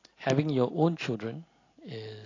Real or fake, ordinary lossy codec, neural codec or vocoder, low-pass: real; AAC, 32 kbps; none; 7.2 kHz